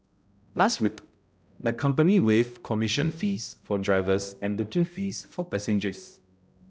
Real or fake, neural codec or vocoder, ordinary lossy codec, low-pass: fake; codec, 16 kHz, 0.5 kbps, X-Codec, HuBERT features, trained on balanced general audio; none; none